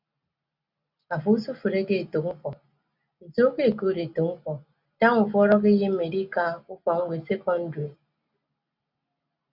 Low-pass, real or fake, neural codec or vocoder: 5.4 kHz; real; none